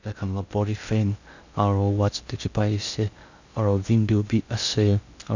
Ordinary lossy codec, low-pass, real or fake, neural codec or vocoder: none; 7.2 kHz; fake; codec, 16 kHz in and 24 kHz out, 0.6 kbps, FocalCodec, streaming, 4096 codes